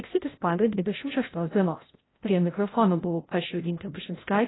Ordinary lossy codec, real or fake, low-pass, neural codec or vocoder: AAC, 16 kbps; fake; 7.2 kHz; codec, 16 kHz, 0.5 kbps, FreqCodec, larger model